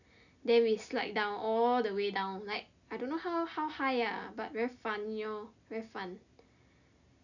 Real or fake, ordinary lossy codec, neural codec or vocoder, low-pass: real; none; none; 7.2 kHz